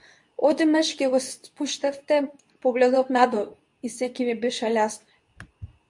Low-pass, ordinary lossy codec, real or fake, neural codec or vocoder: 10.8 kHz; MP3, 64 kbps; fake; codec, 24 kHz, 0.9 kbps, WavTokenizer, medium speech release version 2